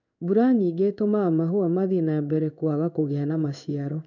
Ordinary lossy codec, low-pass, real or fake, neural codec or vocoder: none; 7.2 kHz; fake; codec, 16 kHz in and 24 kHz out, 1 kbps, XY-Tokenizer